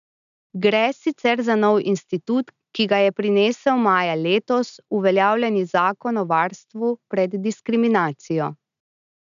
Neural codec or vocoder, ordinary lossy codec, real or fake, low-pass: none; none; real; 7.2 kHz